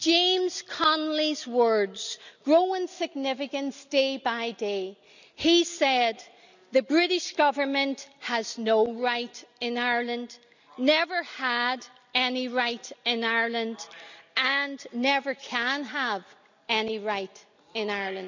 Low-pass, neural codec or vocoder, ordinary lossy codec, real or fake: 7.2 kHz; none; none; real